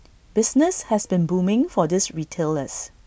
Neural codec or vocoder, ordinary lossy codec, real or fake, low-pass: none; none; real; none